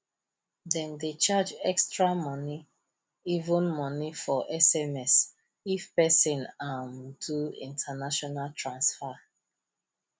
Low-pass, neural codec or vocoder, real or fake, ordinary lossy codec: none; none; real; none